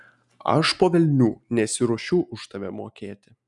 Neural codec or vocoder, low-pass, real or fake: none; 10.8 kHz; real